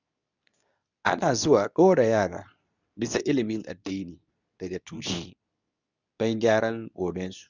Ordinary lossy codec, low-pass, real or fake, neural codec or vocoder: none; 7.2 kHz; fake; codec, 24 kHz, 0.9 kbps, WavTokenizer, medium speech release version 2